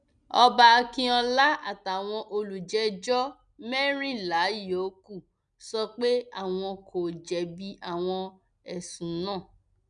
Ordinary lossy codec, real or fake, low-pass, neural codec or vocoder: none; real; 9.9 kHz; none